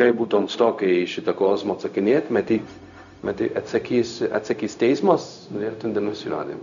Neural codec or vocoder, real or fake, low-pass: codec, 16 kHz, 0.4 kbps, LongCat-Audio-Codec; fake; 7.2 kHz